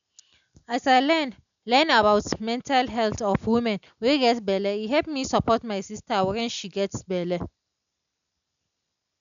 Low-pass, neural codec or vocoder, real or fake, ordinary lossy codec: 7.2 kHz; none; real; none